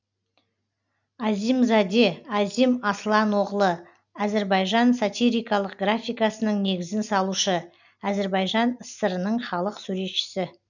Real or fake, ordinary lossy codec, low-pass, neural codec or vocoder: real; none; 7.2 kHz; none